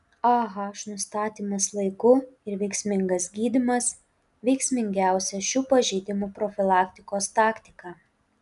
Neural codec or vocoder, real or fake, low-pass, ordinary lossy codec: none; real; 10.8 kHz; MP3, 96 kbps